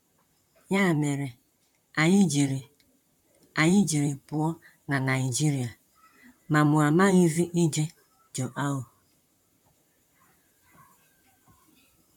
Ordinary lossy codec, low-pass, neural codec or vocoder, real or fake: none; 19.8 kHz; vocoder, 44.1 kHz, 128 mel bands, Pupu-Vocoder; fake